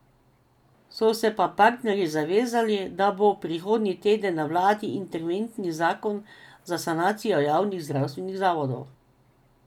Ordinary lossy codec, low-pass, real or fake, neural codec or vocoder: none; 19.8 kHz; real; none